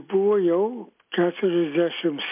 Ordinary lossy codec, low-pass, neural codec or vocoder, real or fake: MP3, 32 kbps; 3.6 kHz; none; real